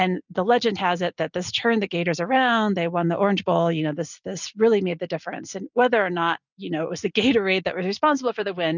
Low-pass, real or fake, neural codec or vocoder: 7.2 kHz; real; none